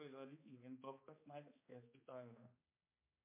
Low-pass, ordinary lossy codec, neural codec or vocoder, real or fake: 3.6 kHz; MP3, 24 kbps; codec, 16 kHz, 2 kbps, X-Codec, HuBERT features, trained on balanced general audio; fake